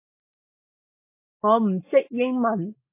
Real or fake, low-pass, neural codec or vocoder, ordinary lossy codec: real; 3.6 kHz; none; MP3, 24 kbps